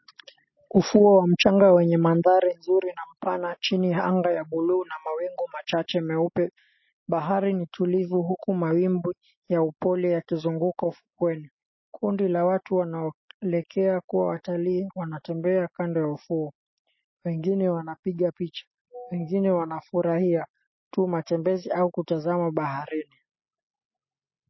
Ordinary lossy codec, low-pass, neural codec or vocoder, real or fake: MP3, 24 kbps; 7.2 kHz; none; real